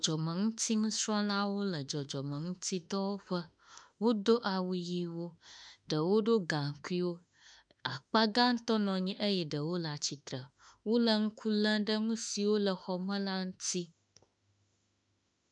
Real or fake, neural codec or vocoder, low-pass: fake; autoencoder, 48 kHz, 32 numbers a frame, DAC-VAE, trained on Japanese speech; 9.9 kHz